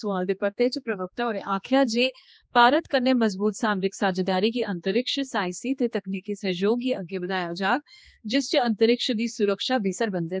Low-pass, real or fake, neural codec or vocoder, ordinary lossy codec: none; fake; codec, 16 kHz, 2 kbps, X-Codec, HuBERT features, trained on general audio; none